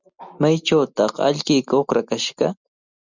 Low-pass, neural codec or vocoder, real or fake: 7.2 kHz; none; real